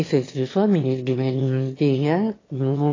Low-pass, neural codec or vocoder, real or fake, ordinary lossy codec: 7.2 kHz; autoencoder, 22.05 kHz, a latent of 192 numbers a frame, VITS, trained on one speaker; fake; AAC, 32 kbps